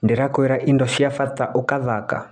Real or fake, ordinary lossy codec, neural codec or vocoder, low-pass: real; none; none; 9.9 kHz